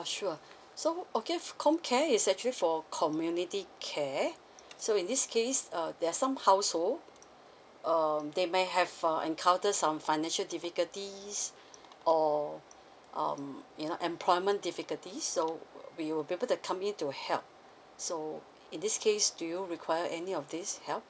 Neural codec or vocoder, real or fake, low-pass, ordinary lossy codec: none; real; none; none